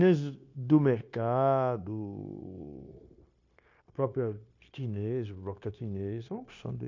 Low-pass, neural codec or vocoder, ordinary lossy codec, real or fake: 7.2 kHz; codec, 16 kHz, 0.9 kbps, LongCat-Audio-Codec; MP3, 48 kbps; fake